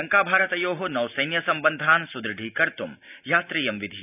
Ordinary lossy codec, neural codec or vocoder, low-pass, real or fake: none; none; 3.6 kHz; real